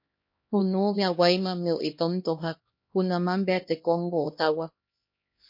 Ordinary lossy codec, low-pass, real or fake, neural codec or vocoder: MP3, 32 kbps; 5.4 kHz; fake; codec, 16 kHz, 1 kbps, X-Codec, HuBERT features, trained on LibriSpeech